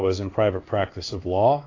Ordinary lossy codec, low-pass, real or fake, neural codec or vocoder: AAC, 32 kbps; 7.2 kHz; fake; codec, 16 kHz in and 24 kHz out, 1 kbps, XY-Tokenizer